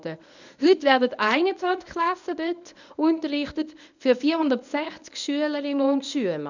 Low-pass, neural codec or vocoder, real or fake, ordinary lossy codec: 7.2 kHz; codec, 24 kHz, 0.9 kbps, WavTokenizer, medium speech release version 1; fake; none